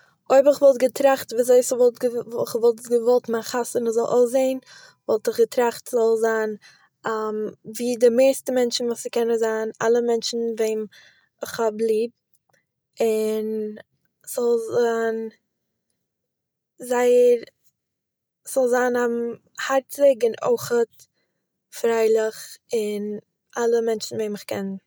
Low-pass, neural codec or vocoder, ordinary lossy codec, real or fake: none; none; none; real